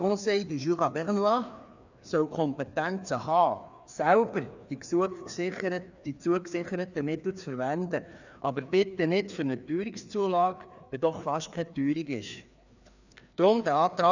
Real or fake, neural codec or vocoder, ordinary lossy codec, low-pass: fake; codec, 16 kHz, 2 kbps, FreqCodec, larger model; none; 7.2 kHz